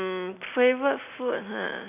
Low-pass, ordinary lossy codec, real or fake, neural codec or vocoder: 3.6 kHz; none; real; none